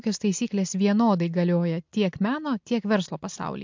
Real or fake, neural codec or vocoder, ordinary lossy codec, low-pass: real; none; MP3, 64 kbps; 7.2 kHz